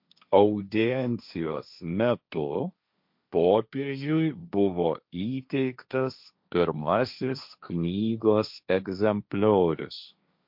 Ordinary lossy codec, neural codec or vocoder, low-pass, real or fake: MP3, 48 kbps; codec, 16 kHz, 1.1 kbps, Voila-Tokenizer; 5.4 kHz; fake